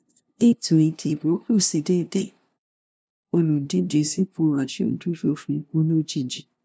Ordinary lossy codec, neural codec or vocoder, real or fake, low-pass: none; codec, 16 kHz, 0.5 kbps, FunCodec, trained on LibriTTS, 25 frames a second; fake; none